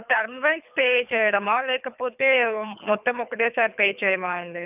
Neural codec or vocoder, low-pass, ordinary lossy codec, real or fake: codec, 24 kHz, 3 kbps, HILCodec; 3.6 kHz; none; fake